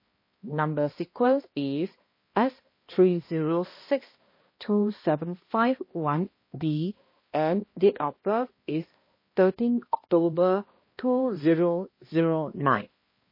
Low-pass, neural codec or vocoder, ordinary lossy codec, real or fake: 5.4 kHz; codec, 16 kHz, 1 kbps, X-Codec, HuBERT features, trained on balanced general audio; MP3, 24 kbps; fake